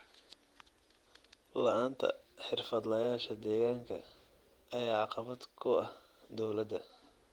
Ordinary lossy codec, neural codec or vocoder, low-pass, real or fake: Opus, 24 kbps; vocoder, 48 kHz, 128 mel bands, Vocos; 19.8 kHz; fake